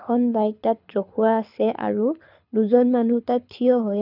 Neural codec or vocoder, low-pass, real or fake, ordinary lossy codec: codec, 24 kHz, 6 kbps, HILCodec; 5.4 kHz; fake; none